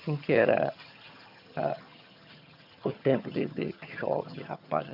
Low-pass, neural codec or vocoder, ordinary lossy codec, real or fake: 5.4 kHz; vocoder, 22.05 kHz, 80 mel bands, HiFi-GAN; none; fake